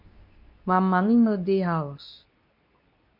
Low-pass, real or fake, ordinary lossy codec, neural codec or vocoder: 5.4 kHz; fake; MP3, 48 kbps; codec, 24 kHz, 0.9 kbps, WavTokenizer, medium speech release version 2